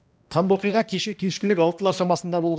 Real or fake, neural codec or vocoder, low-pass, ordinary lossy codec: fake; codec, 16 kHz, 1 kbps, X-Codec, HuBERT features, trained on balanced general audio; none; none